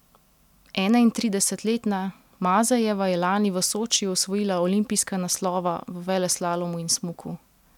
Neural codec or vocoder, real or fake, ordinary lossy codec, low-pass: none; real; none; 19.8 kHz